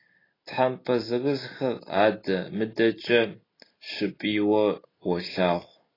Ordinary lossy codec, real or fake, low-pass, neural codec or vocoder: AAC, 24 kbps; real; 5.4 kHz; none